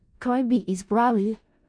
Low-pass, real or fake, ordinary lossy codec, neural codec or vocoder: 9.9 kHz; fake; none; codec, 16 kHz in and 24 kHz out, 0.4 kbps, LongCat-Audio-Codec, four codebook decoder